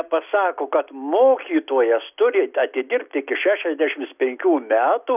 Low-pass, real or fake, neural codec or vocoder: 3.6 kHz; real; none